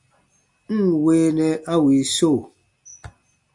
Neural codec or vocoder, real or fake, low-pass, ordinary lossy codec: none; real; 10.8 kHz; MP3, 64 kbps